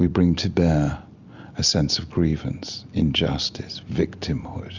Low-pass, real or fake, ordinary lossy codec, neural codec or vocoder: 7.2 kHz; real; Opus, 64 kbps; none